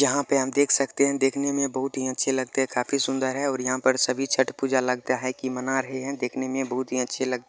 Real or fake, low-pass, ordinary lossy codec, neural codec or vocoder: real; none; none; none